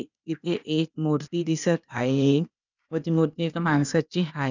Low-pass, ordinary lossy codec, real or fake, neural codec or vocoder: 7.2 kHz; none; fake; codec, 16 kHz, 0.8 kbps, ZipCodec